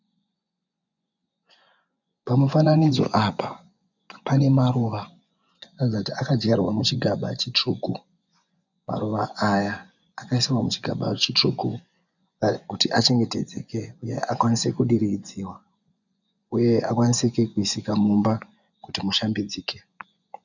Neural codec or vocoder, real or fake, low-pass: vocoder, 24 kHz, 100 mel bands, Vocos; fake; 7.2 kHz